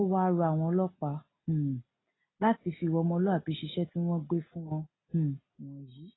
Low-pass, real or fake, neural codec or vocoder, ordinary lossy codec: 7.2 kHz; real; none; AAC, 16 kbps